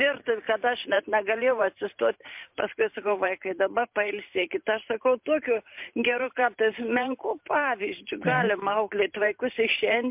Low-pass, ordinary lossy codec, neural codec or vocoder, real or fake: 3.6 kHz; MP3, 32 kbps; vocoder, 44.1 kHz, 128 mel bands every 512 samples, BigVGAN v2; fake